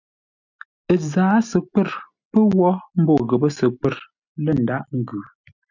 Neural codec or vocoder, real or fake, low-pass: none; real; 7.2 kHz